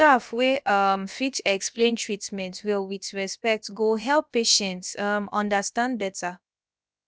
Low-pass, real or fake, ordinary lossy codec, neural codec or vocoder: none; fake; none; codec, 16 kHz, about 1 kbps, DyCAST, with the encoder's durations